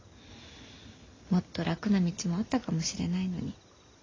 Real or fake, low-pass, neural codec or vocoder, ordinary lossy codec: real; 7.2 kHz; none; AAC, 32 kbps